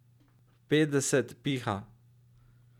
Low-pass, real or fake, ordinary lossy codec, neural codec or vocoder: 19.8 kHz; real; none; none